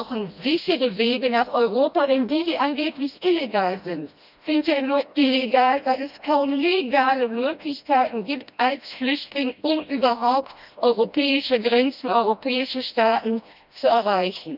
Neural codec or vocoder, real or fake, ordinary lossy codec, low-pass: codec, 16 kHz, 1 kbps, FreqCodec, smaller model; fake; none; 5.4 kHz